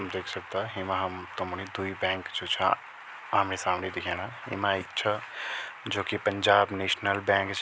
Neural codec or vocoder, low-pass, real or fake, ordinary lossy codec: none; none; real; none